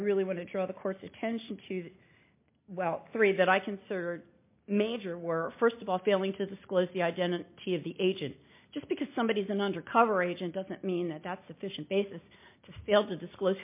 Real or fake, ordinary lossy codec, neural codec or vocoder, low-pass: real; MP3, 24 kbps; none; 3.6 kHz